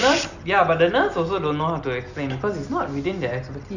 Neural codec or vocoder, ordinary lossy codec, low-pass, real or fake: none; none; 7.2 kHz; real